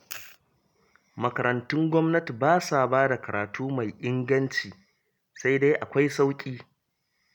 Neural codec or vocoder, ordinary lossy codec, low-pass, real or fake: none; none; 19.8 kHz; real